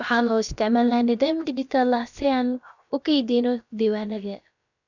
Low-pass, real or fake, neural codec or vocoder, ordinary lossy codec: 7.2 kHz; fake; codec, 16 kHz, about 1 kbps, DyCAST, with the encoder's durations; none